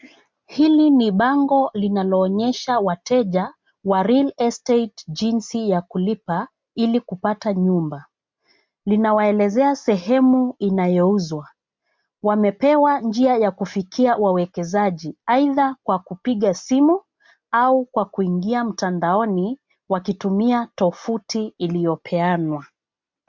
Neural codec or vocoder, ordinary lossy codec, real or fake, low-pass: none; MP3, 64 kbps; real; 7.2 kHz